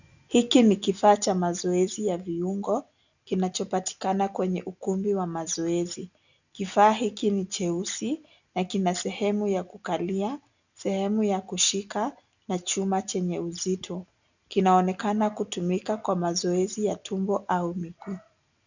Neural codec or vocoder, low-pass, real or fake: none; 7.2 kHz; real